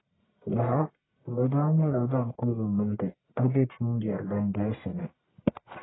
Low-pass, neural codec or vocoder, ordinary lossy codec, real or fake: 7.2 kHz; codec, 44.1 kHz, 1.7 kbps, Pupu-Codec; AAC, 16 kbps; fake